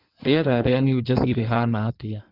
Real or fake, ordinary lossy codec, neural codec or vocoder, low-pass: fake; Opus, 32 kbps; codec, 16 kHz in and 24 kHz out, 1.1 kbps, FireRedTTS-2 codec; 5.4 kHz